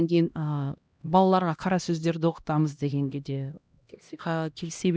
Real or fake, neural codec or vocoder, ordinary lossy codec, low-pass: fake; codec, 16 kHz, 1 kbps, X-Codec, HuBERT features, trained on LibriSpeech; none; none